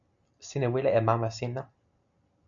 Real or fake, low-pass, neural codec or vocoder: real; 7.2 kHz; none